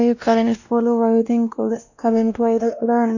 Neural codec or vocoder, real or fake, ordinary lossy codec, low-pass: codec, 16 kHz, 1 kbps, X-Codec, WavLM features, trained on Multilingual LibriSpeech; fake; none; 7.2 kHz